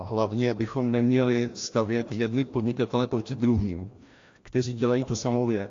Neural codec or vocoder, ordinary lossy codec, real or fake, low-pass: codec, 16 kHz, 1 kbps, FreqCodec, larger model; AAC, 48 kbps; fake; 7.2 kHz